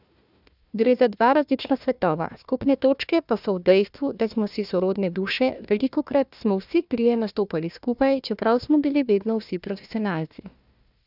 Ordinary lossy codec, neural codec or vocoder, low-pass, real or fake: none; codec, 16 kHz, 1 kbps, FunCodec, trained on Chinese and English, 50 frames a second; 5.4 kHz; fake